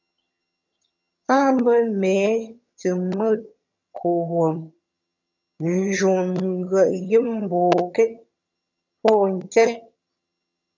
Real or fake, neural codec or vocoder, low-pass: fake; vocoder, 22.05 kHz, 80 mel bands, HiFi-GAN; 7.2 kHz